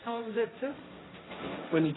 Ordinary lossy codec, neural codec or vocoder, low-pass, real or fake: AAC, 16 kbps; codec, 16 kHz, 1.1 kbps, Voila-Tokenizer; 7.2 kHz; fake